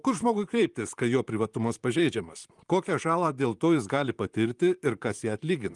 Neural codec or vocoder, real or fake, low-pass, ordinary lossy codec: vocoder, 44.1 kHz, 128 mel bands, Pupu-Vocoder; fake; 10.8 kHz; Opus, 32 kbps